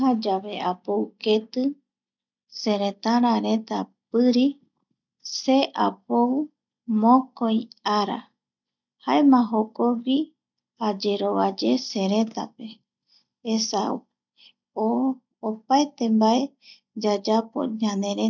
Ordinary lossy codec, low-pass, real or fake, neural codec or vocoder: none; 7.2 kHz; real; none